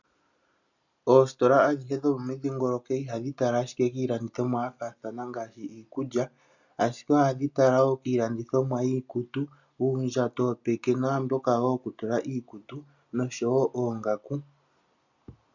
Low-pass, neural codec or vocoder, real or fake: 7.2 kHz; none; real